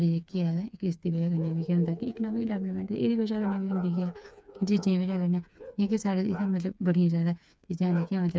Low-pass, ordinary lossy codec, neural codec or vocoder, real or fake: none; none; codec, 16 kHz, 4 kbps, FreqCodec, smaller model; fake